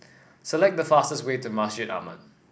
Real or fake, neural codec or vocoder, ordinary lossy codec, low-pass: real; none; none; none